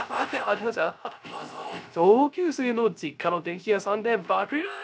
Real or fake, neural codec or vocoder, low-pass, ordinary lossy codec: fake; codec, 16 kHz, 0.3 kbps, FocalCodec; none; none